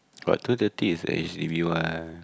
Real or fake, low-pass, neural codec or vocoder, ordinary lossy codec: real; none; none; none